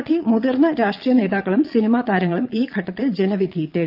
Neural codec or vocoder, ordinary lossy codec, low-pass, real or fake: vocoder, 22.05 kHz, 80 mel bands, Vocos; Opus, 24 kbps; 5.4 kHz; fake